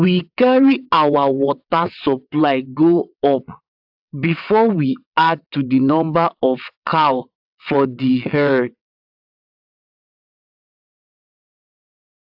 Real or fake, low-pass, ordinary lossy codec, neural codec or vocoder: fake; 5.4 kHz; AAC, 48 kbps; vocoder, 22.05 kHz, 80 mel bands, WaveNeXt